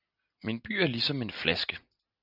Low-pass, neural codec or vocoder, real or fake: 5.4 kHz; none; real